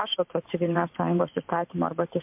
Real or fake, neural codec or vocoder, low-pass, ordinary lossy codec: fake; vocoder, 44.1 kHz, 128 mel bands every 512 samples, BigVGAN v2; 3.6 kHz; MP3, 32 kbps